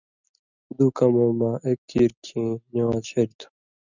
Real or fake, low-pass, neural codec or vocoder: real; 7.2 kHz; none